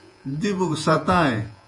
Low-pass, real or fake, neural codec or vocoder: 10.8 kHz; fake; vocoder, 48 kHz, 128 mel bands, Vocos